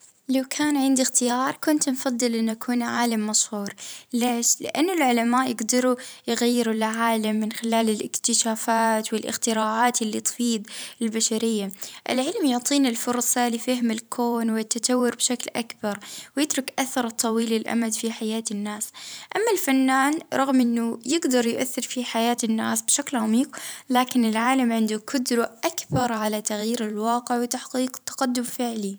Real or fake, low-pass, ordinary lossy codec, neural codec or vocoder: fake; none; none; vocoder, 44.1 kHz, 128 mel bands every 512 samples, BigVGAN v2